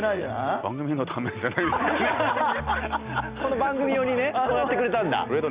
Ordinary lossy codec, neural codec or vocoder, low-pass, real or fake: Opus, 64 kbps; none; 3.6 kHz; real